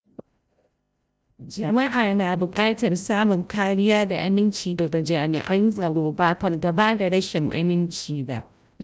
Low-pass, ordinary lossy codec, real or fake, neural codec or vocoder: none; none; fake; codec, 16 kHz, 0.5 kbps, FreqCodec, larger model